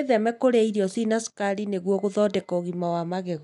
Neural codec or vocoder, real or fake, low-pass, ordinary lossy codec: none; real; 10.8 kHz; none